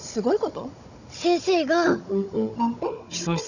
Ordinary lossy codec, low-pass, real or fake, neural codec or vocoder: none; 7.2 kHz; fake; codec, 16 kHz, 16 kbps, FunCodec, trained on Chinese and English, 50 frames a second